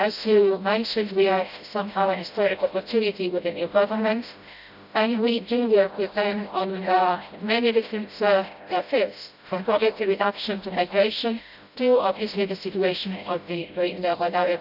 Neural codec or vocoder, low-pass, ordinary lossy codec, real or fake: codec, 16 kHz, 0.5 kbps, FreqCodec, smaller model; 5.4 kHz; none; fake